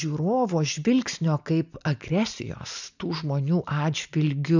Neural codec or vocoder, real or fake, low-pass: none; real; 7.2 kHz